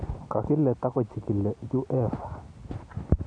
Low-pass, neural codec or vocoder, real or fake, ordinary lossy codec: 9.9 kHz; vocoder, 44.1 kHz, 128 mel bands every 256 samples, BigVGAN v2; fake; none